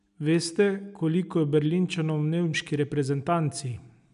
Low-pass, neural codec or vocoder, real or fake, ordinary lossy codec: 10.8 kHz; none; real; none